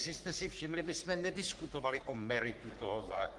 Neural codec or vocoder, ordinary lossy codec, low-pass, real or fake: codec, 44.1 kHz, 3.4 kbps, Pupu-Codec; Opus, 24 kbps; 10.8 kHz; fake